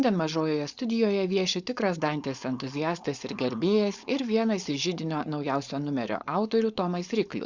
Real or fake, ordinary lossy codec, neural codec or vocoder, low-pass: fake; Opus, 64 kbps; codec, 16 kHz, 4.8 kbps, FACodec; 7.2 kHz